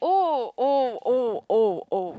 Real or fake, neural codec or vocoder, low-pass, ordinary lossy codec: real; none; none; none